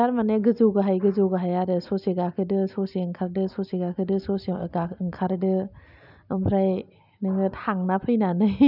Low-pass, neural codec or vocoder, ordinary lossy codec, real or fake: 5.4 kHz; none; none; real